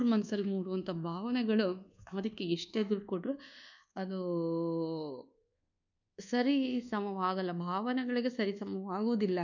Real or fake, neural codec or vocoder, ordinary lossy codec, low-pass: fake; codec, 24 kHz, 1.2 kbps, DualCodec; none; 7.2 kHz